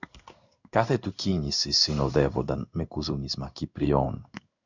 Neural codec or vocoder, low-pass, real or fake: codec, 16 kHz in and 24 kHz out, 1 kbps, XY-Tokenizer; 7.2 kHz; fake